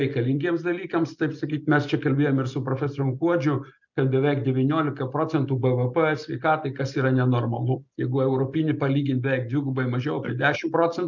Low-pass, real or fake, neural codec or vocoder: 7.2 kHz; real; none